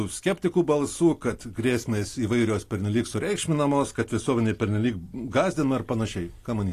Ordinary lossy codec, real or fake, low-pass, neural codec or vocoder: AAC, 48 kbps; real; 14.4 kHz; none